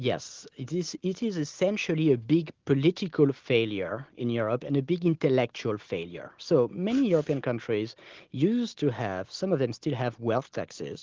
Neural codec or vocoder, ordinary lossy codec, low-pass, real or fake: none; Opus, 16 kbps; 7.2 kHz; real